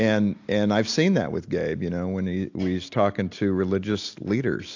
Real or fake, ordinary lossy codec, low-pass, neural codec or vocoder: real; AAC, 48 kbps; 7.2 kHz; none